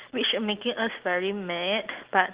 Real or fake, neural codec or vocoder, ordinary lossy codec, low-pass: real; none; Opus, 16 kbps; 3.6 kHz